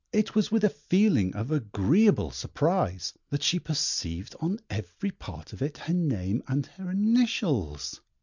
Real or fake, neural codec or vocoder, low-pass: real; none; 7.2 kHz